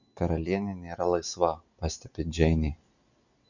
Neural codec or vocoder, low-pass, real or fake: none; 7.2 kHz; real